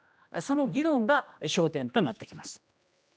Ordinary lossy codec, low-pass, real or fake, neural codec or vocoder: none; none; fake; codec, 16 kHz, 1 kbps, X-Codec, HuBERT features, trained on general audio